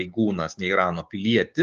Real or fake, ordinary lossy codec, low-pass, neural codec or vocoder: fake; Opus, 32 kbps; 7.2 kHz; codec, 16 kHz, 6 kbps, DAC